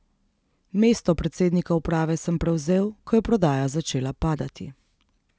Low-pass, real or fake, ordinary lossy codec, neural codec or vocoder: none; real; none; none